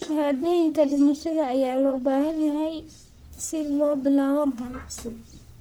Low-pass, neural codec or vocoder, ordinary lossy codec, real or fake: none; codec, 44.1 kHz, 1.7 kbps, Pupu-Codec; none; fake